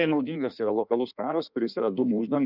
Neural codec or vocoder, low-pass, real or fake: codec, 16 kHz in and 24 kHz out, 1.1 kbps, FireRedTTS-2 codec; 5.4 kHz; fake